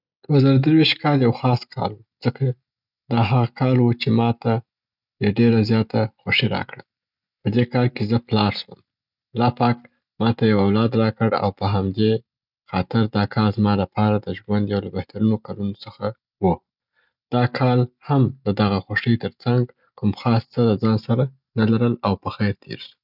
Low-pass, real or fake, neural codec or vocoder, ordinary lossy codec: 5.4 kHz; real; none; none